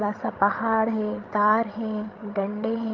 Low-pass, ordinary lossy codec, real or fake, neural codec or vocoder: 7.2 kHz; Opus, 32 kbps; fake; codec, 16 kHz, 16 kbps, FreqCodec, larger model